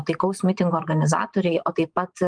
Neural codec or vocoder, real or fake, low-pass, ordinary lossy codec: vocoder, 44.1 kHz, 128 mel bands every 512 samples, BigVGAN v2; fake; 9.9 kHz; Opus, 32 kbps